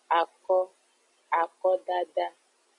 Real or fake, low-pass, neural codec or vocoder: real; 10.8 kHz; none